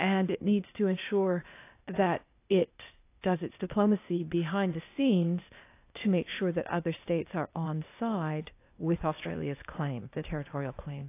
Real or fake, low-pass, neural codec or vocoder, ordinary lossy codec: fake; 3.6 kHz; codec, 16 kHz, 0.8 kbps, ZipCodec; AAC, 24 kbps